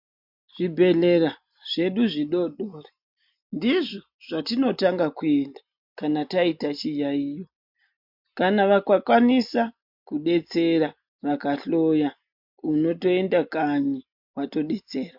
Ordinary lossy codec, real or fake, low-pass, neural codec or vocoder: MP3, 48 kbps; real; 5.4 kHz; none